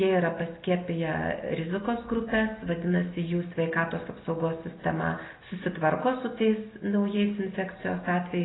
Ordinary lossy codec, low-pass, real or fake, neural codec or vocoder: AAC, 16 kbps; 7.2 kHz; real; none